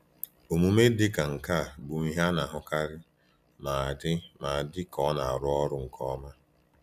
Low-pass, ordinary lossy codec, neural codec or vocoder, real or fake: 14.4 kHz; none; none; real